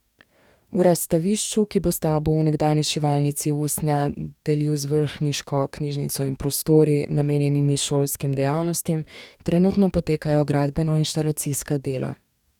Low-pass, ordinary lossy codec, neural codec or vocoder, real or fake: 19.8 kHz; none; codec, 44.1 kHz, 2.6 kbps, DAC; fake